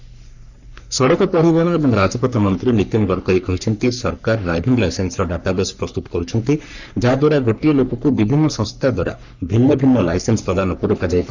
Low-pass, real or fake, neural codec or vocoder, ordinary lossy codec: 7.2 kHz; fake; codec, 44.1 kHz, 3.4 kbps, Pupu-Codec; none